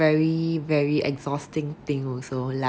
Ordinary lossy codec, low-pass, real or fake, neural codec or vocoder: none; none; real; none